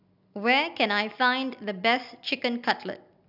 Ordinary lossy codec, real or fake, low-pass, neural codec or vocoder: none; real; 5.4 kHz; none